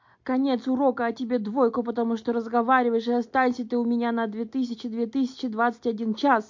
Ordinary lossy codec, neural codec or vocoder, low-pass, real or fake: MP3, 48 kbps; none; 7.2 kHz; real